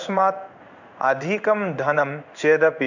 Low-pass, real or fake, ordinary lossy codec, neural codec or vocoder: 7.2 kHz; fake; none; codec, 16 kHz in and 24 kHz out, 1 kbps, XY-Tokenizer